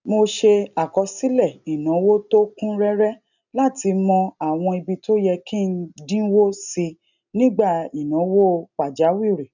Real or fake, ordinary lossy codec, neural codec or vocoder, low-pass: real; none; none; 7.2 kHz